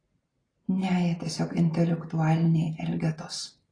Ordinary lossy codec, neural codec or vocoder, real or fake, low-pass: AAC, 32 kbps; none; real; 9.9 kHz